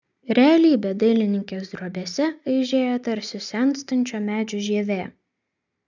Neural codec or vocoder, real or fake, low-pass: none; real; 7.2 kHz